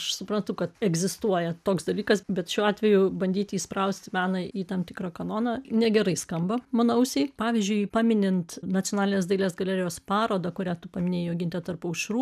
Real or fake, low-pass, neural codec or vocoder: real; 14.4 kHz; none